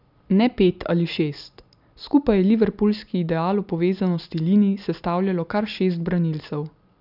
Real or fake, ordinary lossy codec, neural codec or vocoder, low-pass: real; none; none; 5.4 kHz